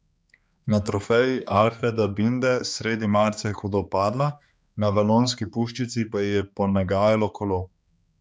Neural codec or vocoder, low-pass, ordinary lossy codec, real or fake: codec, 16 kHz, 2 kbps, X-Codec, HuBERT features, trained on balanced general audio; none; none; fake